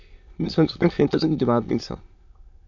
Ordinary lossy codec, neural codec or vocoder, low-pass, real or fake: MP3, 48 kbps; autoencoder, 22.05 kHz, a latent of 192 numbers a frame, VITS, trained on many speakers; 7.2 kHz; fake